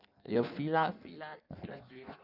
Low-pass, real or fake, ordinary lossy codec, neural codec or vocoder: 5.4 kHz; fake; none; codec, 16 kHz in and 24 kHz out, 1.1 kbps, FireRedTTS-2 codec